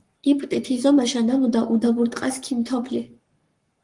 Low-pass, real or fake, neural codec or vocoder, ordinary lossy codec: 10.8 kHz; fake; codec, 44.1 kHz, 7.8 kbps, Pupu-Codec; Opus, 24 kbps